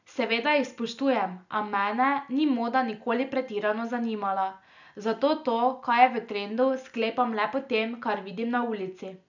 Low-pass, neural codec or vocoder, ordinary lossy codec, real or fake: 7.2 kHz; none; none; real